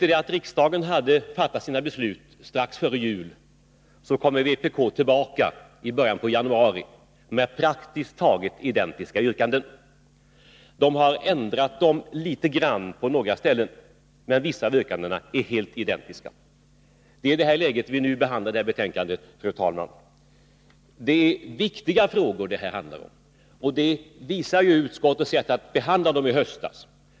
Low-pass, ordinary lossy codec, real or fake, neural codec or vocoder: none; none; real; none